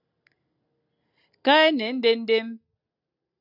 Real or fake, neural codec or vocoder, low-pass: real; none; 5.4 kHz